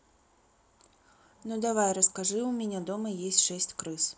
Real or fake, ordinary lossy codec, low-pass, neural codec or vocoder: real; none; none; none